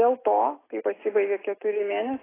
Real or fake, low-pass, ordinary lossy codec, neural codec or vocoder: real; 3.6 kHz; AAC, 16 kbps; none